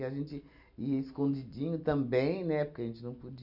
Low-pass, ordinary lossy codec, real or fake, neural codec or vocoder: 5.4 kHz; none; real; none